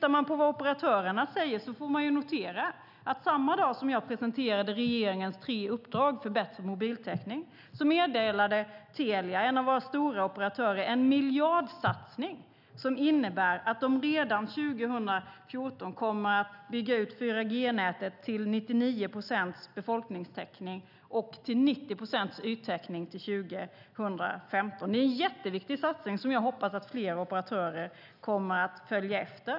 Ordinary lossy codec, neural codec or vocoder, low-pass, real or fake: none; none; 5.4 kHz; real